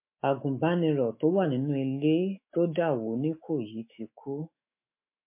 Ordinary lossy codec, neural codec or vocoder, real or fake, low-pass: MP3, 24 kbps; autoencoder, 48 kHz, 128 numbers a frame, DAC-VAE, trained on Japanese speech; fake; 3.6 kHz